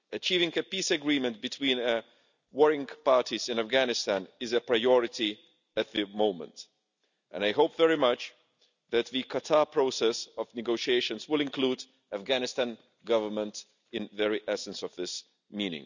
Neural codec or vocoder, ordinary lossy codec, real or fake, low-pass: none; none; real; 7.2 kHz